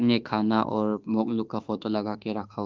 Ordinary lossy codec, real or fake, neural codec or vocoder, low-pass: Opus, 24 kbps; fake; autoencoder, 48 kHz, 32 numbers a frame, DAC-VAE, trained on Japanese speech; 7.2 kHz